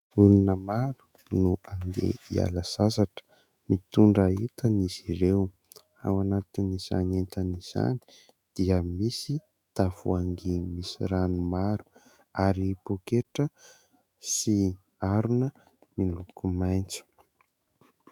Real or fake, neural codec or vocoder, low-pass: fake; autoencoder, 48 kHz, 128 numbers a frame, DAC-VAE, trained on Japanese speech; 19.8 kHz